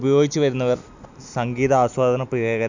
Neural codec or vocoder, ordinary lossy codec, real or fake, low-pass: none; none; real; 7.2 kHz